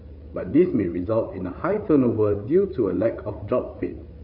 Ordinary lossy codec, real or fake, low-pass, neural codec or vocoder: none; fake; 5.4 kHz; codec, 16 kHz, 8 kbps, FreqCodec, larger model